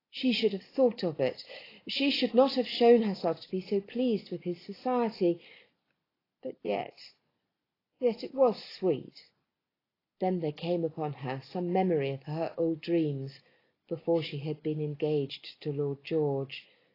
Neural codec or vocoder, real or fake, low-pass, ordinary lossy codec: none; real; 5.4 kHz; AAC, 24 kbps